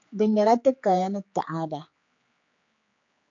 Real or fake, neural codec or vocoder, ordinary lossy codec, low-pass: fake; codec, 16 kHz, 4 kbps, X-Codec, HuBERT features, trained on general audio; AAC, 48 kbps; 7.2 kHz